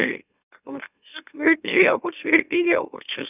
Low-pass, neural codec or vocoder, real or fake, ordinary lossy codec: 3.6 kHz; autoencoder, 44.1 kHz, a latent of 192 numbers a frame, MeloTTS; fake; none